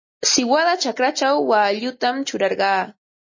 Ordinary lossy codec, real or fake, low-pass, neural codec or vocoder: MP3, 32 kbps; real; 7.2 kHz; none